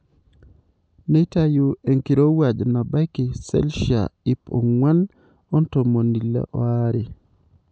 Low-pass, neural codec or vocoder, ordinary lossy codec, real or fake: none; none; none; real